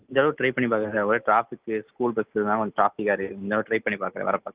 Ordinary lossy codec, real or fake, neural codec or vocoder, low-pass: Opus, 24 kbps; real; none; 3.6 kHz